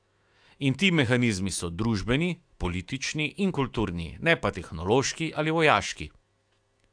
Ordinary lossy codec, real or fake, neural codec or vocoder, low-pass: AAC, 64 kbps; fake; autoencoder, 48 kHz, 128 numbers a frame, DAC-VAE, trained on Japanese speech; 9.9 kHz